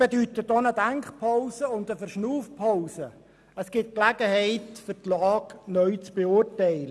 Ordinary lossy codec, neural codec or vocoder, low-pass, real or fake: none; none; none; real